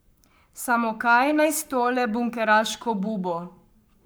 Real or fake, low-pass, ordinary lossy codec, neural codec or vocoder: fake; none; none; codec, 44.1 kHz, 7.8 kbps, Pupu-Codec